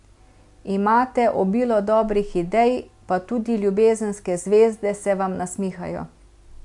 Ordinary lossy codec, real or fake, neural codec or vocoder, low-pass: MP3, 64 kbps; real; none; 10.8 kHz